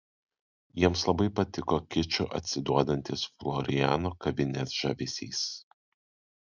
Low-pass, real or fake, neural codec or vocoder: 7.2 kHz; real; none